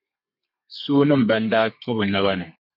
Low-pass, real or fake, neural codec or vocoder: 5.4 kHz; fake; codec, 32 kHz, 1.9 kbps, SNAC